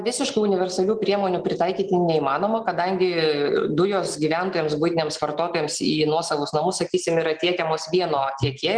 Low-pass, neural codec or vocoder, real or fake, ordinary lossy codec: 9.9 kHz; none; real; Opus, 24 kbps